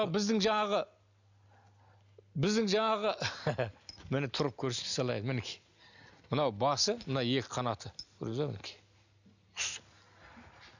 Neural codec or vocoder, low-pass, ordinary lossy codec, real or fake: none; 7.2 kHz; none; real